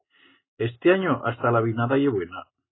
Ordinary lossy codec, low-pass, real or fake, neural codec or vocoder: AAC, 16 kbps; 7.2 kHz; real; none